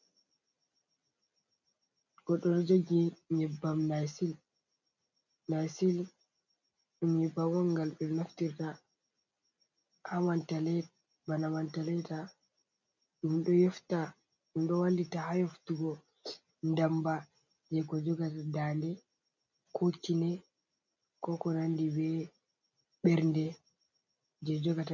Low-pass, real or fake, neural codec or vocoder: 7.2 kHz; real; none